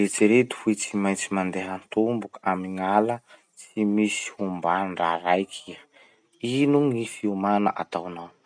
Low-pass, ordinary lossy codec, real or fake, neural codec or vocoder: 9.9 kHz; none; real; none